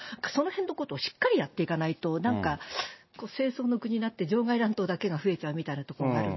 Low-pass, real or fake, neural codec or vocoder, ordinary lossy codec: 7.2 kHz; real; none; MP3, 24 kbps